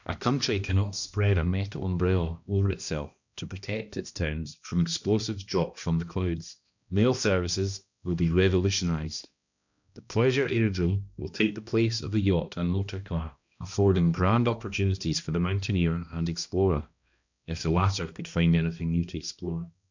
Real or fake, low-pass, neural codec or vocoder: fake; 7.2 kHz; codec, 16 kHz, 1 kbps, X-Codec, HuBERT features, trained on balanced general audio